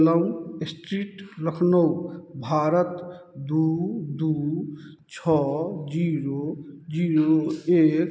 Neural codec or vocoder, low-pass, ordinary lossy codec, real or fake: none; none; none; real